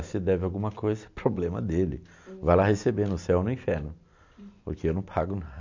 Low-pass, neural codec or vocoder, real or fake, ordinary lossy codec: 7.2 kHz; none; real; MP3, 48 kbps